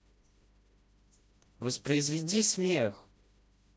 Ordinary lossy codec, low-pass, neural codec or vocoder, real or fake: none; none; codec, 16 kHz, 1 kbps, FreqCodec, smaller model; fake